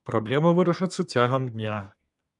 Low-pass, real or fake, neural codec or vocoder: 10.8 kHz; fake; codec, 24 kHz, 1 kbps, SNAC